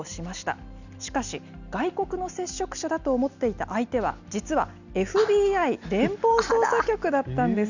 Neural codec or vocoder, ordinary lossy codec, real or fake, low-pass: none; none; real; 7.2 kHz